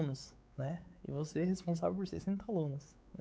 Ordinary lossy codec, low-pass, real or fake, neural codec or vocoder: none; none; fake; codec, 16 kHz, 4 kbps, X-Codec, WavLM features, trained on Multilingual LibriSpeech